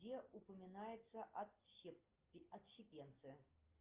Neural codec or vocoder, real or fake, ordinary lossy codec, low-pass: none; real; Opus, 32 kbps; 3.6 kHz